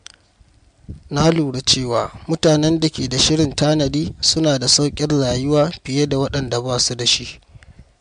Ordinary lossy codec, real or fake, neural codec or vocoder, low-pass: MP3, 64 kbps; real; none; 9.9 kHz